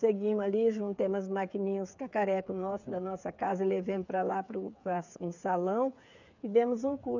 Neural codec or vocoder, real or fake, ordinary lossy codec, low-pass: codec, 16 kHz, 8 kbps, FreqCodec, smaller model; fake; none; 7.2 kHz